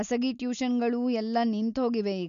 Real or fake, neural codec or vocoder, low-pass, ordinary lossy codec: real; none; 7.2 kHz; none